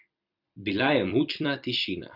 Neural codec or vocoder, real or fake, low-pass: vocoder, 44.1 kHz, 128 mel bands every 256 samples, BigVGAN v2; fake; 5.4 kHz